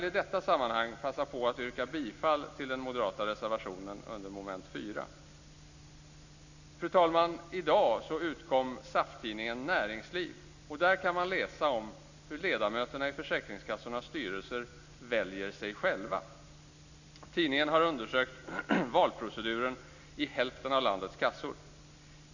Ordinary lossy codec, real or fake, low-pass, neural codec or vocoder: none; real; 7.2 kHz; none